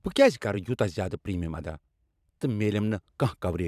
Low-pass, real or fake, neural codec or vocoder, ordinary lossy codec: 14.4 kHz; fake; vocoder, 44.1 kHz, 128 mel bands every 512 samples, BigVGAN v2; none